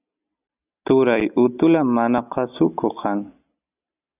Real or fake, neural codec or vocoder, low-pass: real; none; 3.6 kHz